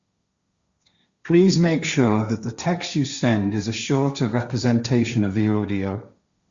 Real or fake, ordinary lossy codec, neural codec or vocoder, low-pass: fake; Opus, 64 kbps; codec, 16 kHz, 1.1 kbps, Voila-Tokenizer; 7.2 kHz